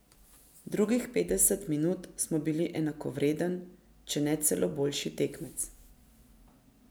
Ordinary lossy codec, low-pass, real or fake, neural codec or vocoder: none; none; real; none